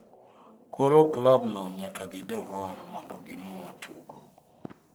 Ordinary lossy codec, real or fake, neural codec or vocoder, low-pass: none; fake; codec, 44.1 kHz, 1.7 kbps, Pupu-Codec; none